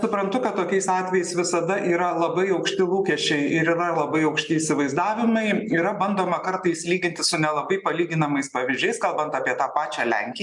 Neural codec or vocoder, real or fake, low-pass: none; real; 10.8 kHz